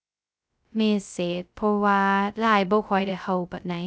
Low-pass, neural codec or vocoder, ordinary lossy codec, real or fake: none; codec, 16 kHz, 0.2 kbps, FocalCodec; none; fake